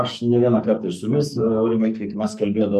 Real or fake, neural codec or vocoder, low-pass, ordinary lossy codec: fake; codec, 44.1 kHz, 2.6 kbps, SNAC; 14.4 kHz; MP3, 64 kbps